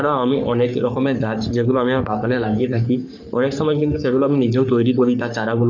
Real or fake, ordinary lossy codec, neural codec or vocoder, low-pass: fake; none; codec, 44.1 kHz, 3.4 kbps, Pupu-Codec; 7.2 kHz